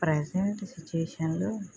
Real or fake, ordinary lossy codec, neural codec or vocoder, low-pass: real; none; none; none